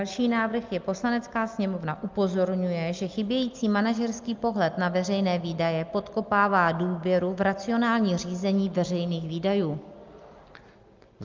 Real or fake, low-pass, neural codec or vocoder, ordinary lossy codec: real; 7.2 kHz; none; Opus, 32 kbps